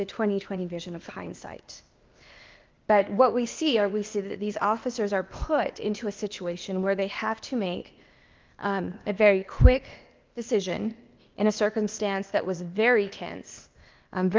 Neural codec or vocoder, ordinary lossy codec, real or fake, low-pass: codec, 16 kHz, 0.8 kbps, ZipCodec; Opus, 24 kbps; fake; 7.2 kHz